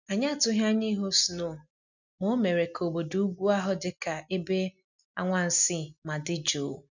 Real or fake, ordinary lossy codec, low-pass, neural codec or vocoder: real; none; 7.2 kHz; none